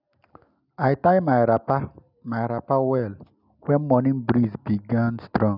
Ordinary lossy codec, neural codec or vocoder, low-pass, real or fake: none; none; 5.4 kHz; real